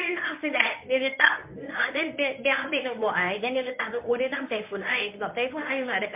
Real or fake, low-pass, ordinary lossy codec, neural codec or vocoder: fake; 3.6 kHz; MP3, 32 kbps; codec, 24 kHz, 0.9 kbps, WavTokenizer, medium speech release version 1